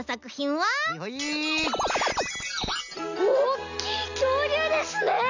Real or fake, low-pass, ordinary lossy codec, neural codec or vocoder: real; 7.2 kHz; none; none